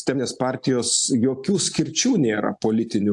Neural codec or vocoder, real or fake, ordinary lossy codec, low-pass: none; real; AAC, 64 kbps; 10.8 kHz